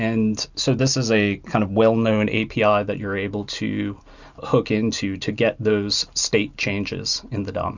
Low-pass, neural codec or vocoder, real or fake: 7.2 kHz; none; real